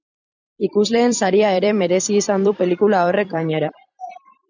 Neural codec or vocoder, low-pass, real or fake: vocoder, 44.1 kHz, 128 mel bands every 256 samples, BigVGAN v2; 7.2 kHz; fake